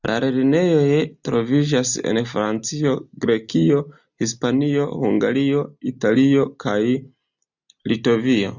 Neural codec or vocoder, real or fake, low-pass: none; real; 7.2 kHz